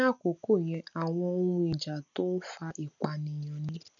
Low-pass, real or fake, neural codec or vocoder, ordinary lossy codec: 7.2 kHz; real; none; none